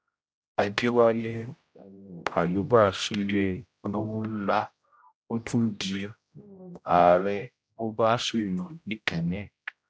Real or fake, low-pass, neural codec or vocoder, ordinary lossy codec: fake; none; codec, 16 kHz, 0.5 kbps, X-Codec, HuBERT features, trained on general audio; none